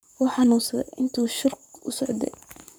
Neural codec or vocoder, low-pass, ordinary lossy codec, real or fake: codec, 44.1 kHz, 7.8 kbps, Pupu-Codec; none; none; fake